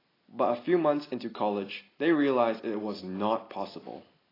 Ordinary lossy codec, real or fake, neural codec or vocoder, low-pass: AAC, 24 kbps; real; none; 5.4 kHz